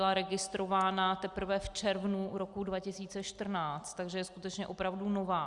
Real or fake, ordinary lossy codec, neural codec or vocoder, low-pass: real; Opus, 64 kbps; none; 10.8 kHz